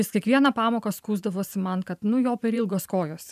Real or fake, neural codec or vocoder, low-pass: fake; vocoder, 44.1 kHz, 128 mel bands every 512 samples, BigVGAN v2; 14.4 kHz